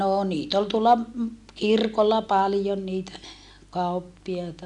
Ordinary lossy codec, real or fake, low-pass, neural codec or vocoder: none; real; 10.8 kHz; none